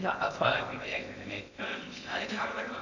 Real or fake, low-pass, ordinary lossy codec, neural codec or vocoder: fake; 7.2 kHz; none; codec, 16 kHz in and 24 kHz out, 0.6 kbps, FocalCodec, streaming, 2048 codes